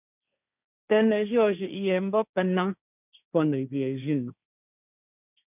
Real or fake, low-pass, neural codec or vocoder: fake; 3.6 kHz; codec, 16 kHz, 1.1 kbps, Voila-Tokenizer